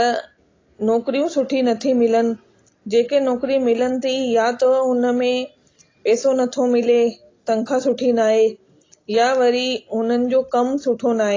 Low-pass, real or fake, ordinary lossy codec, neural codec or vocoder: 7.2 kHz; real; AAC, 32 kbps; none